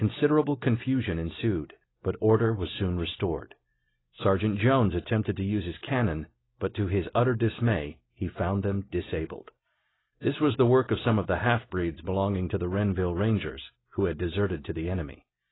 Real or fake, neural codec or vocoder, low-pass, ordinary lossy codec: real; none; 7.2 kHz; AAC, 16 kbps